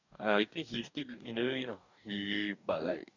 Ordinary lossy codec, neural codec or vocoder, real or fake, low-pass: none; codec, 44.1 kHz, 2.6 kbps, DAC; fake; 7.2 kHz